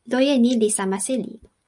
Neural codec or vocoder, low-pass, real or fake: none; 10.8 kHz; real